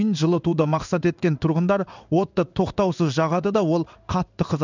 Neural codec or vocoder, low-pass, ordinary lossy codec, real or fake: codec, 16 kHz in and 24 kHz out, 1 kbps, XY-Tokenizer; 7.2 kHz; none; fake